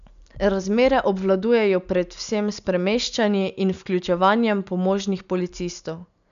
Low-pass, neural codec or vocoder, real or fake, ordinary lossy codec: 7.2 kHz; none; real; none